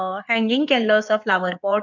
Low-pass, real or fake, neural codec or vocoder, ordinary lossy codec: 7.2 kHz; fake; codec, 16 kHz in and 24 kHz out, 2.2 kbps, FireRedTTS-2 codec; none